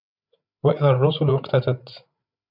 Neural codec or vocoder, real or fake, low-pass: codec, 16 kHz, 8 kbps, FreqCodec, larger model; fake; 5.4 kHz